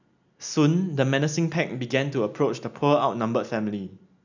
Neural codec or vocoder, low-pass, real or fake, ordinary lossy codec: none; 7.2 kHz; real; none